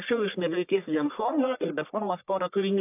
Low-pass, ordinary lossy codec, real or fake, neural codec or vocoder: 3.6 kHz; AAC, 32 kbps; fake; codec, 44.1 kHz, 1.7 kbps, Pupu-Codec